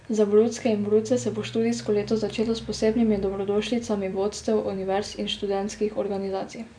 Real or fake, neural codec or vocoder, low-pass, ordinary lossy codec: real; none; 9.9 kHz; none